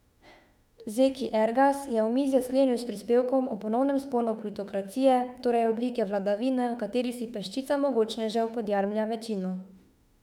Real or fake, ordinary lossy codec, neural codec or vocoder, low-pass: fake; none; autoencoder, 48 kHz, 32 numbers a frame, DAC-VAE, trained on Japanese speech; 19.8 kHz